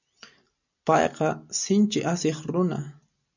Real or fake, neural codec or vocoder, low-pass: real; none; 7.2 kHz